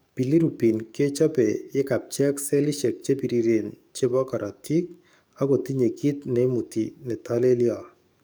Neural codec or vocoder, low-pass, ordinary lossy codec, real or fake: codec, 44.1 kHz, 7.8 kbps, DAC; none; none; fake